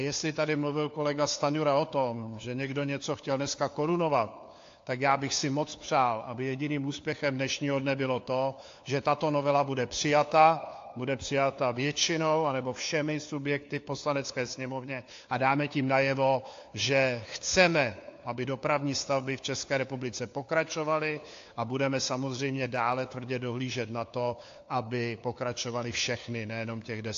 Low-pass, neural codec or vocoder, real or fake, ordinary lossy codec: 7.2 kHz; codec, 16 kHz, 4 kbps, FunCodec, trained on LibriTTS, 50 frames a second; fake; AAC, 48 kbps